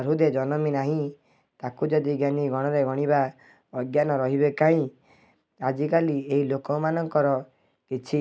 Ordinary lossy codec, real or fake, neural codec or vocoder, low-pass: none; real; none; none